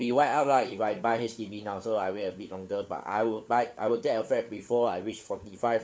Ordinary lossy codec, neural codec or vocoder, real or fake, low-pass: none; codec, 16 kHz, 4 kbps, FunCodec, trained on LibriTTS, 50 frames a second; fake; none